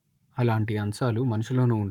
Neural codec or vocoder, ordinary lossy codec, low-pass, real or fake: codec, 44.1 kHz, 7.8 kbps, Pupu-Codec; none; 19.8 kHz; fake